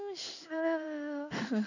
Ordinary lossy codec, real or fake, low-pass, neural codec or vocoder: none; fake; 7.2 kHz; codec, 16 kHz in and 24 kHz out, 1 kbps, XY-Tokenizer